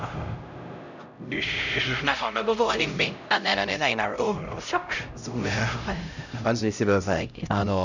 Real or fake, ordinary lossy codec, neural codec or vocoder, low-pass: fake; none; codec, 16 kHz, 0.5 kbps, X-Codec, HuBERT features, trained on LibriSpeech; 7.2 kHz